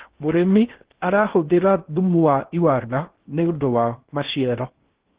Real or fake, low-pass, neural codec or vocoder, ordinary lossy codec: fake; 3.6 kHz; codec, 16 kHz in and 24 kHz out, 0.6 kbps, FocalCodec, streaming, 2048 codes; Opus, 16 kbps